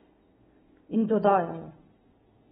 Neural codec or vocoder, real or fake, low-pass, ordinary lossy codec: none; real; 10.8 kHz; AAC, 16 kbps